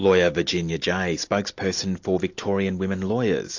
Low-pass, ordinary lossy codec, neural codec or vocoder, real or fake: 7.2 kHz; AAC, 48 kbps; none; real